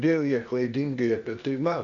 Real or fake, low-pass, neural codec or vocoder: fake; 7.2 kHz; codec, 16 kHz, 0.8 kbps, ZipCodec